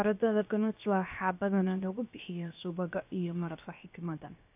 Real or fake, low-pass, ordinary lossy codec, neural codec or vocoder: fake; 3.6 kHz; AAC, 32 kbps; codec, 16 kHz, 0.7 kbps, FocalCodec